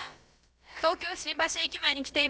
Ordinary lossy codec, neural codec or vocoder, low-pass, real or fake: none; codec, 16 kHz, about 1 kbps, DyCAST, with the encoder's durations; none; fake